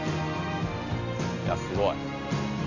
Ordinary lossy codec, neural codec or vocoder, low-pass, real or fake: none; none; 7.2 kHz; real